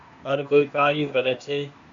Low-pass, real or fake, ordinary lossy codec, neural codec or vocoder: 7.2 kHz; fake; AAC, 64 kbps; codec, 16 kHz, 0.8 kbps, ZipCodec